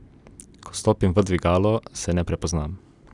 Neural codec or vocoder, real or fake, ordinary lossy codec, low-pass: none; real; none; 10.8 kHz